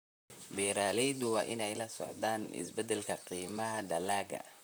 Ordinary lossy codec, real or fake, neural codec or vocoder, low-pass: none; fake; vocoder, 44.1 kHz, 128 mel bands, Pupu-Vocoder; none